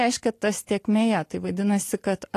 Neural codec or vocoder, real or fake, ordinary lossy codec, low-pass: none; real; AAC, 48 kbps; 14.4 kHz